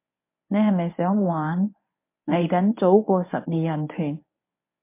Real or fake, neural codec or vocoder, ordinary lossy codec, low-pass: fake; codec, 24 kHz, 0.9 kbps, WavTokenizer, medium speech release version 1; MP3, 24 kbps; 3.6 kHz